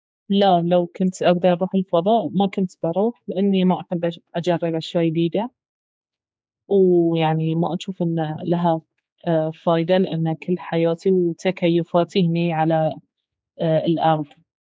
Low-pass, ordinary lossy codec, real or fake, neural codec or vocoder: none; none; fake; codec, 16 kHz, 4 kbps, X-Codec, HuBERT features, trained on general audio